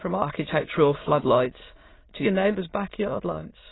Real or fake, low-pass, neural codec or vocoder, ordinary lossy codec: fake; 7.2 kHz; autoencoder, 22.05 kHz, a latent of 192 numbers a frame, VITS, trained on many speakers; AAC, 16 kbps